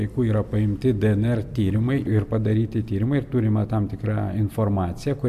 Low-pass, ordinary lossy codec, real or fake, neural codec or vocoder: 14.4 kHz; AAC, 96 kbps; real; none